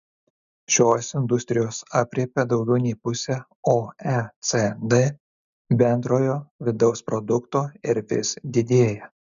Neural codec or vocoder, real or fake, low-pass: none; real; 7.2 kHz